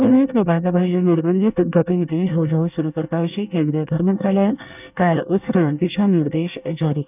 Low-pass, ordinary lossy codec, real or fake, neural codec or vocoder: 3.6 kHz; none; fake; codec, 24 kHz, 1 kbps, SNAC